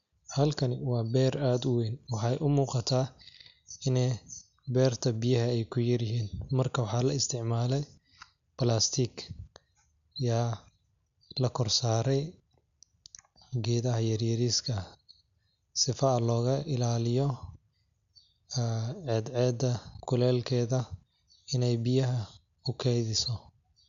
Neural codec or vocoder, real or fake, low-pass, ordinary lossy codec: none; real; 7.2 kHz; none